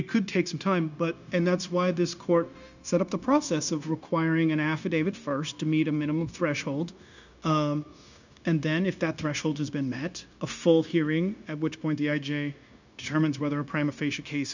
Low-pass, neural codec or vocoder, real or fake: 7.2 kHz; codec, 16 kHz, 0.9 kbps, LongCat-Audio-Codec; fake